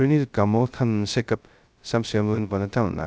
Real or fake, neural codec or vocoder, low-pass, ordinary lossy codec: fake; codec, 16 kHz, 0.2 kbps, FocalCodec; none; none